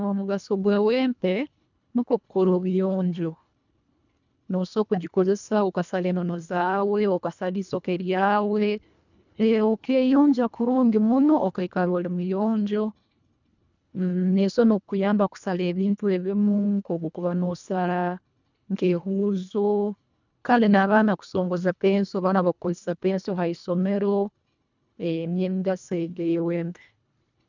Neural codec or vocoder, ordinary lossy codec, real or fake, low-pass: codec, 24 kHz, 1.5 kbps, HILCodec; none; fake; 7.2 kHz